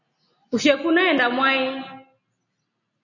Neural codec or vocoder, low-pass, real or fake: none; 7.2 kHz; real